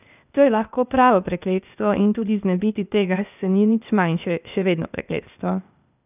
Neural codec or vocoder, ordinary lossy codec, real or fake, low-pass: codec, 16 kHz, 0.8 kbps, ZipCodec; none; fake; 3.6 kHz